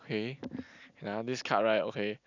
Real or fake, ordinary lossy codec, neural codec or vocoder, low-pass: real; none; none; 7.2 kHz